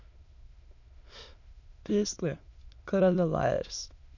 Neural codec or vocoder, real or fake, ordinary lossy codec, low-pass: autoencoder, 22.05 kHz, a latent of 192 numbers a frame, VITS, trained on many speakers; fake; none; 7.2 kHz